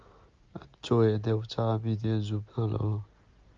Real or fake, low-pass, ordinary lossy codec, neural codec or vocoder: real; 7.2 kHz; Opus, 24 kbps; none